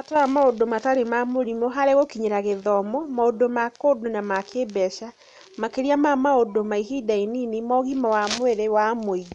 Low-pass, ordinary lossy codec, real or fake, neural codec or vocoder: 10.8 kHz; none; real; none